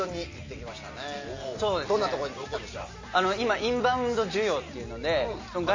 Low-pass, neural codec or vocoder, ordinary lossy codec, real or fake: 7.2 kHz; none; MP3, 32 kbps; real